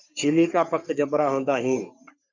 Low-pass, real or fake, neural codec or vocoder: 7.2 kHz; fake; codec, 44.1 kHz, 3.4 kbps, Pupu-Codec